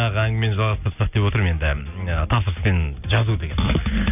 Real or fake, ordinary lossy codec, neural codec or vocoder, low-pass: real; none; none; 3.6 kHz